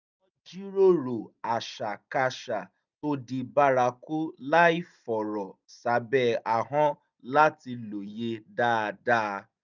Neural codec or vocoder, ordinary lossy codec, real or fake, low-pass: none; none; real; 7.2 kHz